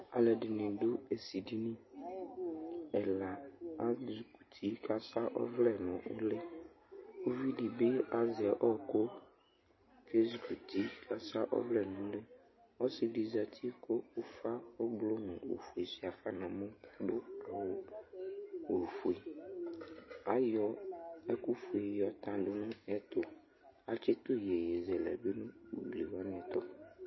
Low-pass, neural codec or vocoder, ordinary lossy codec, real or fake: 7.2 kHz; none; MP3, 24 kbps; real